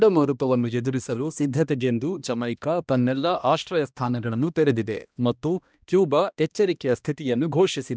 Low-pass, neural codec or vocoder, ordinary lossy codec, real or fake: none; codec, 16 kHz, 1 kbps, X-Codec, HuBERT features, trained on balanced general audio; none; fake